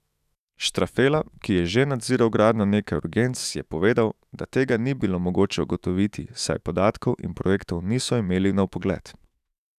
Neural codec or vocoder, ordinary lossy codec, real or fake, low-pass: autoencoder, 48 kHz, 128 numbers a frame, DAC-VAE, trained on Japanese speech; none; fake; 14.4 kHz